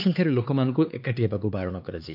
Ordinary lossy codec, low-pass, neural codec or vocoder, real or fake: AAC, 48 kbps; 5.4 kHz; codec, 16 kHz, 4 kbps, X-Codec, HuBERT features, trained on LibriSpeech; fake